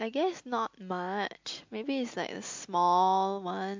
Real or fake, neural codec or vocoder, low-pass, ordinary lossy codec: real; none; 7.2 kHz; MP3, 48 kbps